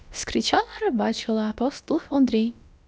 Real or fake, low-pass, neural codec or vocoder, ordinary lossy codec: fake; none; codec, 16 kHz, about 1 kbps, DyCAST, with the encoder's durations; none